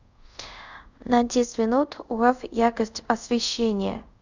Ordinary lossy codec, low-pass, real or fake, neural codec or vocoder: Opus, 64 kbps; 7.2 kHz; fake; codec, 24 kHz, 0.5 kbps, DualCodec